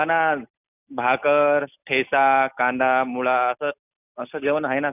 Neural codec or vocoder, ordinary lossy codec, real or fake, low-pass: none; none; real; 3.6 kHz